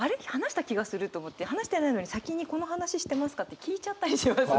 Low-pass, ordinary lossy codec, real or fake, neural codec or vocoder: none; none; real; none